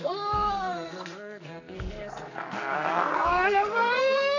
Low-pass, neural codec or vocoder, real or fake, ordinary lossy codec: 7.2 kHz; codec, 44.1 kHz, 2.6 kbps, SNAC; fake; none